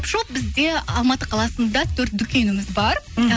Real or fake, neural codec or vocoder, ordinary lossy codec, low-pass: real; none; none; none